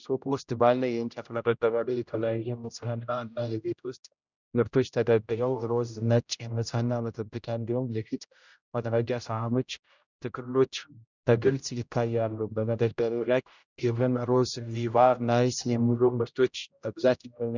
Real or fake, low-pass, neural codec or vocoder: fake; 7.2 kHz; codec, 16 kHz, 0.5 kbps, X-Codec, HuBERT features, trained on general audio